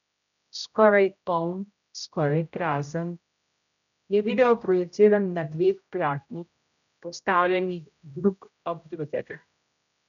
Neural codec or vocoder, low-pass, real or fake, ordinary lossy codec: codec, 16 kHz, 0.5 kbps, X-Codec, HuBERT features, trained on general audio; 7.2 kHz; fake; none